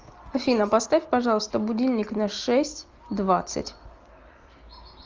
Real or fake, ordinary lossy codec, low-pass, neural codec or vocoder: real; Opus, 24 kbps; 7.2 kHz; none